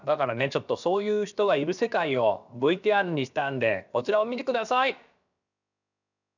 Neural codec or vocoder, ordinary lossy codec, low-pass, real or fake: codec, 16 kHz, about 1 kbps, DyCAST, with the encoder's durations; none; 7.2 kHz; fake